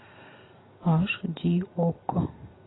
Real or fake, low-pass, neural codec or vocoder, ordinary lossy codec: real; 7.2 kHz; none; AAC, 16 kbps